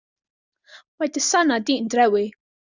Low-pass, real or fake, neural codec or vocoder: 7.2 kHz; real; none